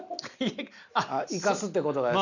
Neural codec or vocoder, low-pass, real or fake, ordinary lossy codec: none; 7.2 kHz; real; none